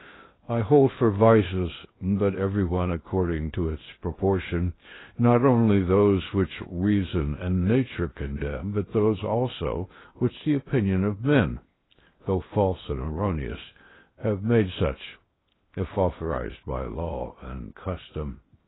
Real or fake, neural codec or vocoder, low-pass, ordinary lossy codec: fake; codec, 16 kHz in and 24 kHz out, 0.8 kbps, FocalCodec, streaming, 65536 codes; 7.2 kHz; AAC, 16 kbps